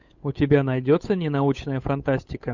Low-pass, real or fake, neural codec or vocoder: 7.2 kHz; fake; codec, 16 kHz, 8 kbps, FunCodec, trained on Chinese and English, 25 frames a second